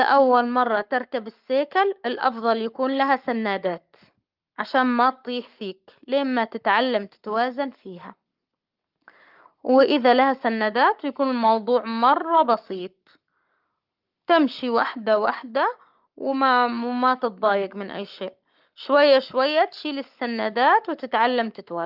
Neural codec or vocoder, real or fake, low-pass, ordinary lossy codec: codec, 44.1 kHz, 7.8 kbps, Pupu-Codec; fake; 5.4 kHz; Opus, 24 kbps